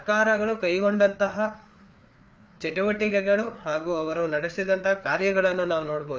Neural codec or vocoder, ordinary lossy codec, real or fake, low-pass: codec, 16 kHz, 4 kbps, FreqCodec, larger model; none; fake; none